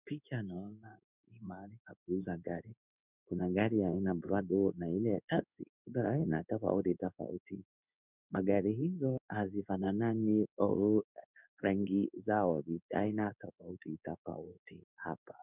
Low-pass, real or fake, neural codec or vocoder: 3.6 kHz; fake; codec, 16 kHz in and 24 kHz out, 1 kbps, XY-Tokenizer